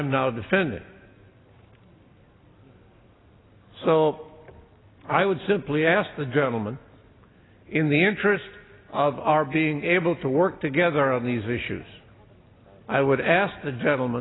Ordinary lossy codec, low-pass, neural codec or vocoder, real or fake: AAC, 16 kbps; 7.2 kHz; none; real